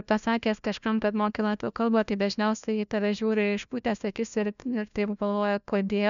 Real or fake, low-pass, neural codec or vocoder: fake; 7.2 kHz; codec, 16 kHz, 1 kbps, FunCodec, trained on LibriTTS, 50 frames a second